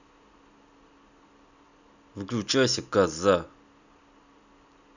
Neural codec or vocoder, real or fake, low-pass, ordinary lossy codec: none; real; 7.2 kHz; none